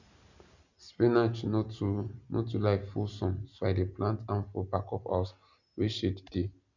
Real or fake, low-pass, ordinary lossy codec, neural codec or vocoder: real; 7.2 kHz; none; none